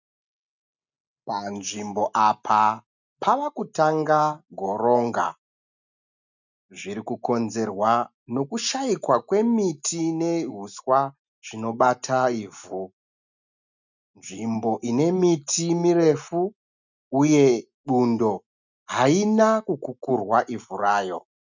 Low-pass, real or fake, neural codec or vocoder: 7.2 kHz; real; none